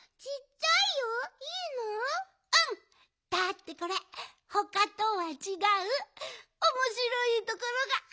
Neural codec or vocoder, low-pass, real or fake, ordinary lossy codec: none; none; real; none